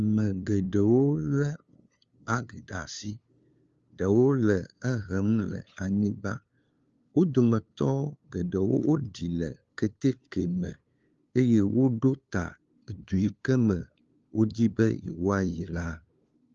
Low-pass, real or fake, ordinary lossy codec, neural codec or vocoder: 7.2 kHz; fake; Opus, 32 kbps; codec, 16 kHz, 2 kbps, FunCodec, trained on LibriTTS, 25 frames a second